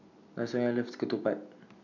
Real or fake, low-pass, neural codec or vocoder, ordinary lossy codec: real; 7.2 kHz; none; none